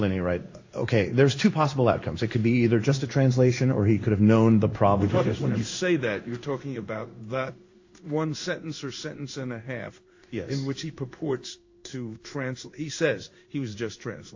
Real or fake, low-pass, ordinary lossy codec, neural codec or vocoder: fake; 7.2 kHz; AAC, 48 kbps; codec, 16 kHz in and 24 kHz out, 1 kbps, XY-Tokenizer